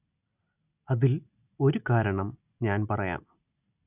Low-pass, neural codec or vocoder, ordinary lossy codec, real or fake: 3.6 kHz; none; none; real